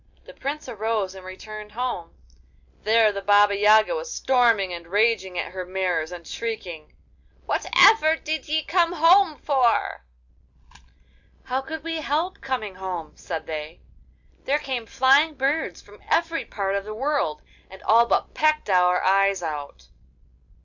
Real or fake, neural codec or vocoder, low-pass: real; none; 7.2 kHz